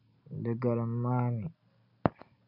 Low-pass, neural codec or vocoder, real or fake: 5.4 kHz; none; real